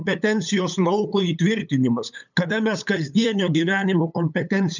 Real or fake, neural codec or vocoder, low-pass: fake; codec, 16 kHz, 8 kbps, FunCodec, trained on LibriTTS, 25 frames a second; 7.2 kHz